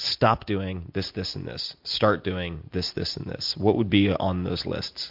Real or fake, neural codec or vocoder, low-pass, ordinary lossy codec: real; none; 5.4 kHz; MP3, 48 kbps